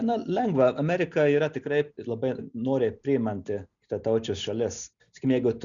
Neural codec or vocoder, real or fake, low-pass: none; real; 7.2 kHz